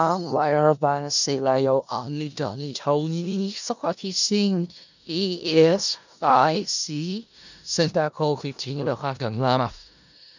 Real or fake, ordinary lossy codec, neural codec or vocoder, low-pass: fake; none; codec, 16 kHz in and 24 kHz out, 0.4 kbps, LongCat-Audio-Codec, four codebook decoder; 7.2 kHz